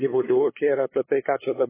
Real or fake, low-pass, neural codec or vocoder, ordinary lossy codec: fake; 3.6 kHz; codec, 16 kHz, 2 kbps, FunCodec, trained on LibriTTS, 25 frames a second; MP3, 16 kbps